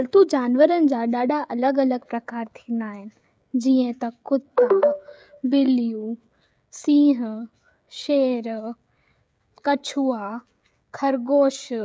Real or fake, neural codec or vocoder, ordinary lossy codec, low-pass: fake; codec, 16 kHz, 16 kbps, FreqCodec, smaller model; none; none